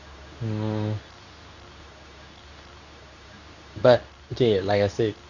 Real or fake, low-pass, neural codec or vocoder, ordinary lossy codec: fake; 7.2 kHz; codec, 24 kHz, 0.9 kbps, WavTokenizer, medium speech release version 2; none